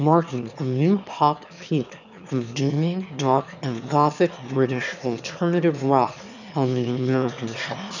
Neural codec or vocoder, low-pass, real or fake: autoencoder, 22.05 kHz, a latent of 192 numbers a frame, VITS, trained on one speaker; 7.2 kHz; fake